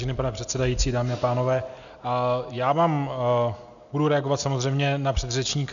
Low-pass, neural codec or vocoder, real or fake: 7.2 kHz; none; real